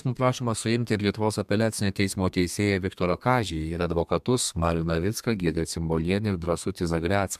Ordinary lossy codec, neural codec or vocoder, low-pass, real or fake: MP3, 96 kbps; codec, 32 kHz, 1.9 kbps, SNAC; 14.4 kHz; fake